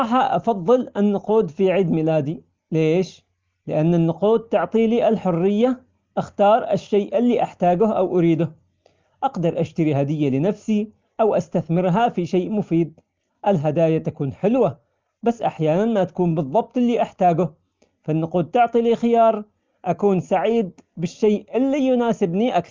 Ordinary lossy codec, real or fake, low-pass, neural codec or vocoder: Opus, 32 kbps; real; 7.2 kHz; none